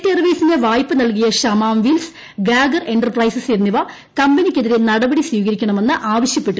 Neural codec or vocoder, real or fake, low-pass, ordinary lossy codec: none; real; none; none